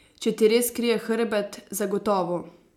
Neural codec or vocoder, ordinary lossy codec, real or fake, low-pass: none; MP3, 96 kbps; real; 19.8 kHz